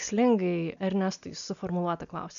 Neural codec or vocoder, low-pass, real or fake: none; 7.2 kHz; real